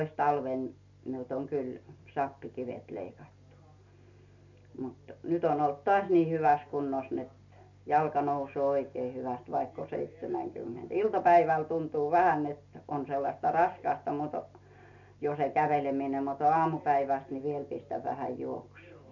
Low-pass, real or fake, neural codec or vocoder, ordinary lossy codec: 7.2 kHz; real; none; MP3, 64 kbps